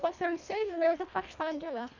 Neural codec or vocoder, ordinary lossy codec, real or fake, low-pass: codec, 24 kHz, 1.5 kbps, HILCodec; none; fake; 7.2 kHz